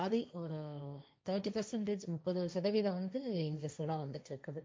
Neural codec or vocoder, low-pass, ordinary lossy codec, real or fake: codec, 16 kHz, 1.1 kbps, Voila-Tokenizer; none; none; fake